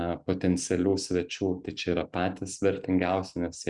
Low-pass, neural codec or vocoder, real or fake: 10.8 kHz; none; real